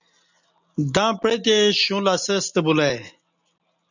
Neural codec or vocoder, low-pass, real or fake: none; 7.2 kHz; real